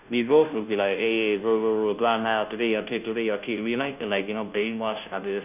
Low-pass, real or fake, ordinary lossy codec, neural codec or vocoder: 3.6 kHz; fake; none; codec, 16 kHz, 0.5 kbps, FunCodec, trained on Chinese and English, 25 frames a second